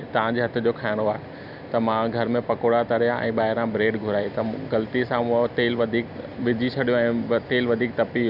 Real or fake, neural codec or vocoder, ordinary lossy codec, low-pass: real; none; none; 5.4 kHz